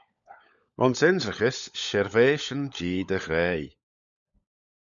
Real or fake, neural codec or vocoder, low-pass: fake; codec, 16 kHz, 16 kbps, FunCodec, trained on LibriTTS, 50 frames a second; 7.2 kHz